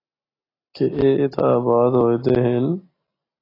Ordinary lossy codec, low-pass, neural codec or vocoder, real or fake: AAC, 24 kbps; 5.4 kHz; none; real